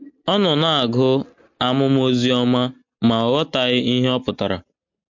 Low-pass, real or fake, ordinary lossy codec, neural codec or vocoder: 7.2 kHz; real; MP3, 48 kbps; none